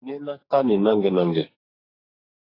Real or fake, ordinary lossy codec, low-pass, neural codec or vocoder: fake; AAC, 24 kbps; 5.4 kHz; codec, 44.1 kHz, 2.6 kbps, SNAC